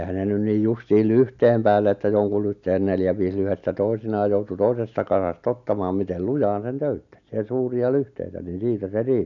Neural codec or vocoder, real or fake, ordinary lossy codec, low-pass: none; real; none; 7.2 kHz